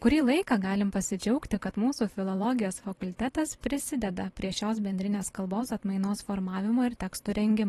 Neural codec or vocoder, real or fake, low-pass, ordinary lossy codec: vocoder, 44.1 kHz, 128 mel bands every 512 samples, BigVGAN v2; fake; 19.8 kHz; AAC, 32 kbps